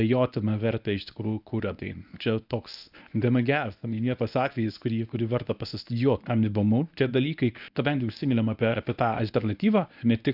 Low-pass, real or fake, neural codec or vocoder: 5.4 kHz; fake; codec, 24 kHz, 0.9 kbps, WavTokenizer, medium speech release version 1